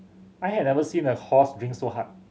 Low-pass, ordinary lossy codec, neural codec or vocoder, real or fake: none; none; none; real